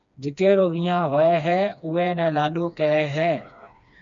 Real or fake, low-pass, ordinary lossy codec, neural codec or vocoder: fake; 7.2 kHz; MP3, 64 kbps; codec, 16 kHz, 2 kbps, FreqCodec, smaller model